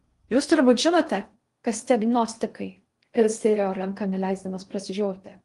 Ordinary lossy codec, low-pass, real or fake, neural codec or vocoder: Opus, 24 kbps; 10.8 kHz; fake; codec, 16 kHz in and 24 kHz out, 0.6 kbps, FocalCodec, streaming, 2048 codes